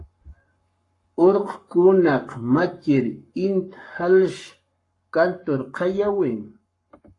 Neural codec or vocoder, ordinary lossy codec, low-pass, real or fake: codec, 44.1 kHz, 7.8 kbps, Pupu-Codec; AAC, 48 kbps; 10.8 kHz; fake